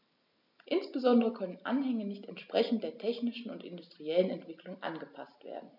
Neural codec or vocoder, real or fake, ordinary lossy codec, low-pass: none; real; none; 5.4 kHz